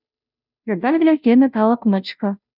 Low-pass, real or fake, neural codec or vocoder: 5.4 kHz; fake; codec, 16 kHz, 0.5 kbps, FunCodec, trained on Chinese and English, 25 frames a second